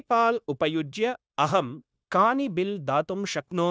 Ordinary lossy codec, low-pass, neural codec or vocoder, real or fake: none; none; codec, 16 kHz, 0.9 kbps, LongCat-Audio-Codec; fake